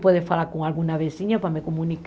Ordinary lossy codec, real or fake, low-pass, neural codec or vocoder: none; real; none; none